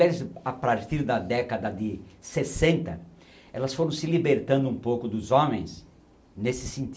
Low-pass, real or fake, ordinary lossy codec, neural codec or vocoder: none; real; none; none